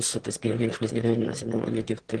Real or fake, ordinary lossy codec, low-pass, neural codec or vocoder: fake; Opus, 16 kbps; 9.9 kHz; autoencoder, 22.05 kHz, a latent of 192 numbers a frame, VITS, trained on one speaker